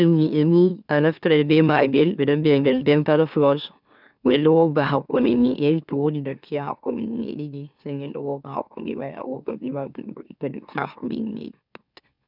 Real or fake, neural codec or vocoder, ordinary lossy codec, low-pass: fake; autoencoder, 44.1 kHz, a latent of 192 numbers a frame, MeloTTS; none; 5.4 kHz